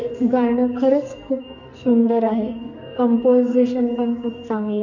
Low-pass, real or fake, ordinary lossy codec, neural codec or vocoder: 7.2 kHz; fake; none; codec, 44.1 kHz, 2.6 kbps, SNAC